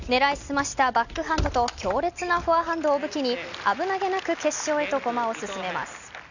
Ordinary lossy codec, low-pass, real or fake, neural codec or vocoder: none; 7.2 kHz; real; none